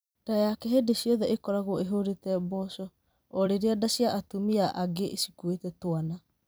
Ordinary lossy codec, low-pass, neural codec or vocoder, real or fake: none; none; vocoder, 44.1 kHz, 128 mel bands every 256 samples, BigVGAN v2; fake